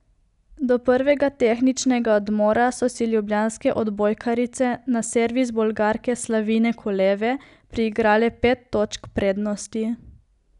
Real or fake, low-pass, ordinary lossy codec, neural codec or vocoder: real; 10.8 kHz; none; none